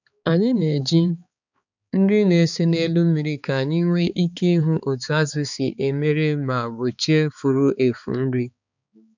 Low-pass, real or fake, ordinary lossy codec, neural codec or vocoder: 7.2 kHz; fake; none; codec, 16 kHz, 4 kbps, X-Codec, HuBERT features, trained on balanced general audio